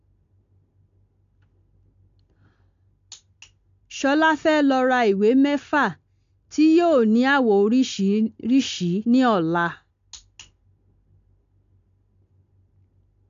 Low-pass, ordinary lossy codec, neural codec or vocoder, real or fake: 7.2 kHz; AAC, 64 kbps; none; real